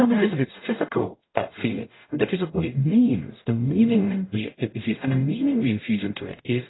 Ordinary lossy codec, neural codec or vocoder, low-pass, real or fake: AAC, 16 kbps; codec, 44.1 kHz, 0.9 kbps, DAC; 7.2 kHz; fake